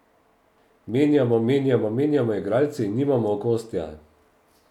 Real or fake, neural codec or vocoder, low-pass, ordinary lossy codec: real; none; 19.8 kHz; none